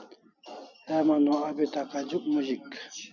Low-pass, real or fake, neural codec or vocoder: 7.2 kHz; real; none